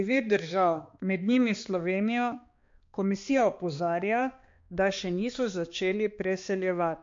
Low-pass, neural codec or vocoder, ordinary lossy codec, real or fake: 7.2 kHz; codec, 16 kHz, 2 kbps, X-Codec, HuBERT features, trained on balanced general audio; MP3, 48 kbps; fake